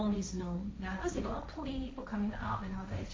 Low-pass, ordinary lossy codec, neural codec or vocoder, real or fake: none; none; codec, 16 kHz, 1.1 kbps, Voila-Tokenizer; fake